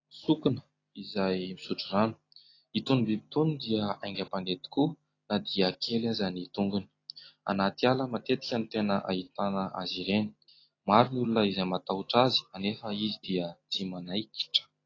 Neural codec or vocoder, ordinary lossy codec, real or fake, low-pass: none; AAC, 32 kbps; real; 7.2 kHz